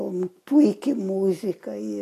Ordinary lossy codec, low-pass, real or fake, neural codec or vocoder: AAC, 48 kbps; 14.4 kHz; real; none